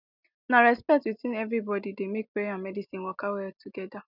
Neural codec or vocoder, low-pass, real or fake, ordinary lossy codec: none; 5.4 kHz; real; none